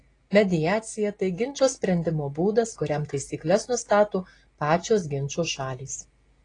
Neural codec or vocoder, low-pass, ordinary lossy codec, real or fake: none; 9.9 kHz; AAC, 32 kbps; real